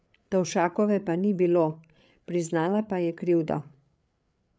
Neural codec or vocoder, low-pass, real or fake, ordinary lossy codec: codec, 16 kHz, 8 kbps, FreqCodec, larger model; none; fake; none